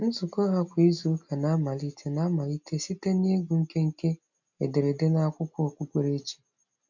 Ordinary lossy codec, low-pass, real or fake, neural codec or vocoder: none; 7.2 kHz; real; none